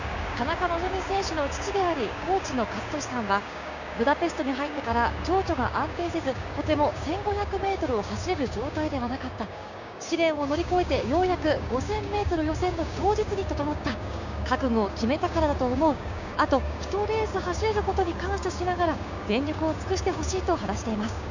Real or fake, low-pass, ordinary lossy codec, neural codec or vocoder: fake; 7.2 kHz; none; codec, 16 kHz, 6 kbps, DAC